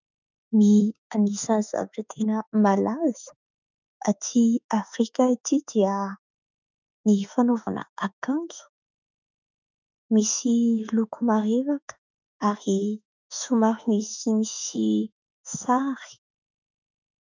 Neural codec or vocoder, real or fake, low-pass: autoencoder, 48 kHz, 32 numbers a frame, DAC-VAE, trained on Japanese speech; fake; 7.2 kHz